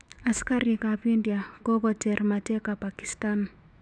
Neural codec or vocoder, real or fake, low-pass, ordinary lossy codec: autoencoder, 48 kHz, 128 numbers a frame, DAC-VAE, trained on Japanese speech; fake; 9.9 kHz; none